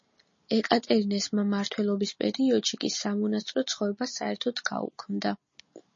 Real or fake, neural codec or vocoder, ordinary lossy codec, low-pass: real; none; MP3, 32 kbps; 7.2 kHz